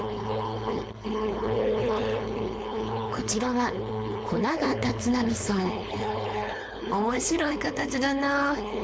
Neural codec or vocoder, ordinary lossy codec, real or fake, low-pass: codec, 16 kHz, 4.8 kbps, FACodec; none; fake; none